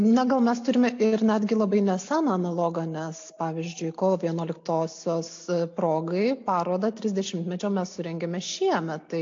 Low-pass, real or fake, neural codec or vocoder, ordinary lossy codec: 7.2 kHz; real; none; AAC, 48 kbps